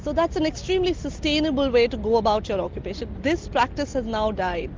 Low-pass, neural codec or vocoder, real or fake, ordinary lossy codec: 7.2 kHz; none; real; Opus, 32 kbps